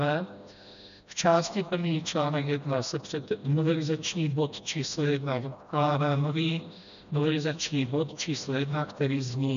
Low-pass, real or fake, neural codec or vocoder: 7.2 kHz; fake; codec, 16 kHz, 1 kbps, FreqCodec, smaller model